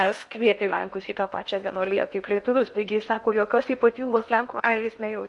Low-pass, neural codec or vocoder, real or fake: 10.8 kHz; codec, 16 kHz in and 24 kHz out, 0.8 kbps, FocalCodec, streaming, 65536 codes; fake